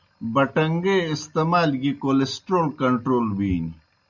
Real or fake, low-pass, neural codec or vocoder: real; 7.2 kHz; none